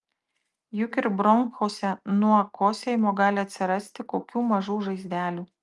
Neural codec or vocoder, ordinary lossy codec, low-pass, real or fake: none; Opus, 32 kbps; 10.8 kHz; real